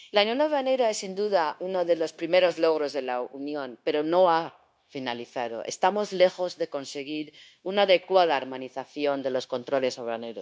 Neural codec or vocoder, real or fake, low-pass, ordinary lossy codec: codec, 16 kHz, 0.9 kbps, LongCat-Audio-Codec; fake; none; none